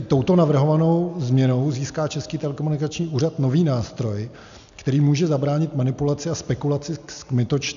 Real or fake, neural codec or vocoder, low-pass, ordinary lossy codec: real; none; 7.2 kHz; AAC, 96 kbps